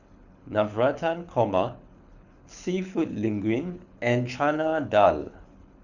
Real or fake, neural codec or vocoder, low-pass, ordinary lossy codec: fake; codec, 24 kHz, 6 kbps, HILCodec; 7.2 kHz; none